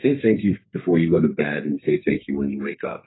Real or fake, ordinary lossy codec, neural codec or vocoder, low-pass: fake; AAC, 16 kbps; codec, 32 kHz, 1.9 kbps, SNAC; 7.2 kHz